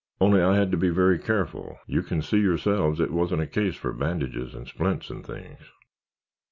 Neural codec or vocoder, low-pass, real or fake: none; 7.2 kHz; real